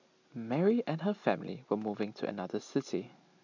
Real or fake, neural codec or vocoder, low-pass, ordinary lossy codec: real; none; 7.2 kHz; none